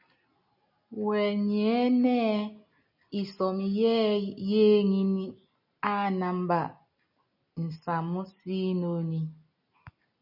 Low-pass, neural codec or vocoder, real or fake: 5.4 kHz; none; real